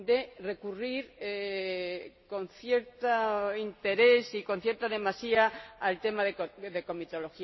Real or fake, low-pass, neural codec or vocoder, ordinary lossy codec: real; 7.2 kHz; none; MP3, 24 kbps